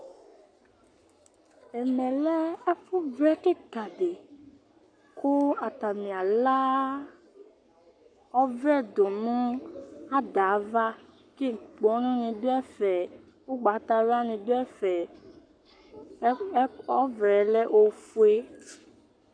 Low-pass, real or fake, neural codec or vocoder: 9.9 kHz; fake; codec, 44.1 kHz, 7.8 kbps, Pupu-Codec